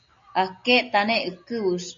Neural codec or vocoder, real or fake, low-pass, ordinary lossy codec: none; real; 7.2 kHz; MP3, 48 kbps